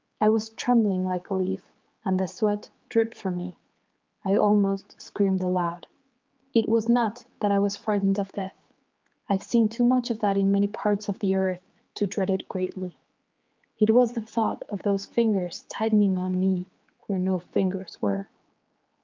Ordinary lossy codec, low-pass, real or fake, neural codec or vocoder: Opus, 24 kbps; 7.2 kHz; fake; codec, 16 kHz, 4 kbps, X-Codec, HuBERT features, trained on general audio